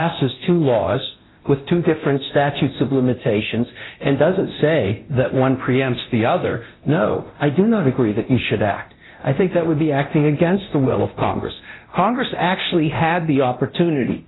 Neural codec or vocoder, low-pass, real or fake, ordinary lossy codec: codec, 24 kHz, 0.9 kbps, DualCodec; 7.2 kHz; fake; AAC, 16 kbps